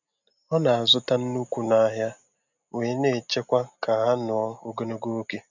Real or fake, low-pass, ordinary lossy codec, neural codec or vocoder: real; 7.2 kHz; none; none